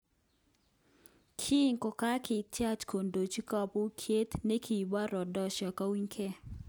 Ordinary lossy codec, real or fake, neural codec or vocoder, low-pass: none; real; none; none